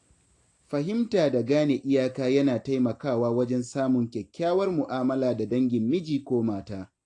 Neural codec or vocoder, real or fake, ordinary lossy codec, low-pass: none; real; AAC, 48 kbps; 10.8 kHz